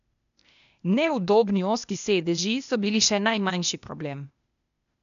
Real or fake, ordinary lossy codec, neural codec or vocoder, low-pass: fake; none; codec, 16 kHz, 0.8 kbps, ZipCodec; 7.2 kHz